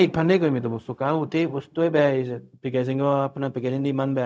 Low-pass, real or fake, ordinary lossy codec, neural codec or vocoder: none; fake; none; codec, 16 kHz, 0.4 kbps, LongCat-Audio-Codec